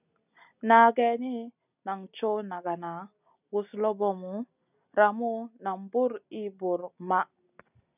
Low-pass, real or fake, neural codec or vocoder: 3.6 kHz; real; none